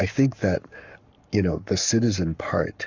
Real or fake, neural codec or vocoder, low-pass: fake; codec, 44.1 kHz, 7.8 kbps, Pupu-Codec; 7.2 kHz